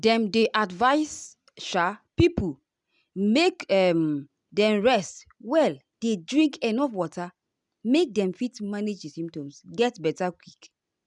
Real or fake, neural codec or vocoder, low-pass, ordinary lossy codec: real; none; 10.8 kHz; none